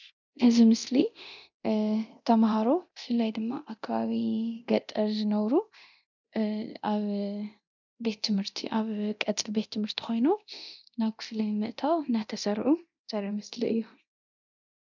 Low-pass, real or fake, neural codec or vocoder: 7.2 kHz; fake; codec, 24 kHz, 0.9 kbps, DualCodec